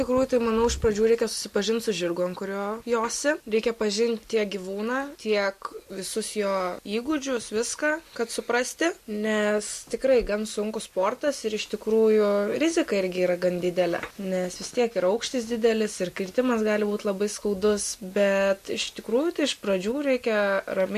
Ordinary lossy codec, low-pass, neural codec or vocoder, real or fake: MP3, 64 kbps; 14.4 kHz; none; real